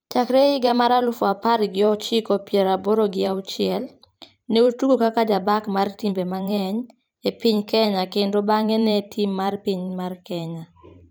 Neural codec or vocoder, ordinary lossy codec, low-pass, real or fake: vocoder, 44.1 kHz, 128 mel bands every 512 samples, BigVGAN v2; none; none; fake